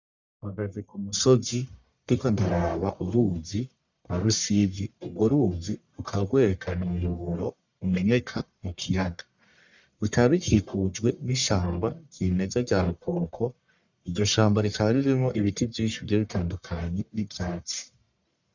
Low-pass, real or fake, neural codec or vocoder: 7.2 kHz; fake; codec, 44.1 kHz, 1.7 kbps, Pupu-Codec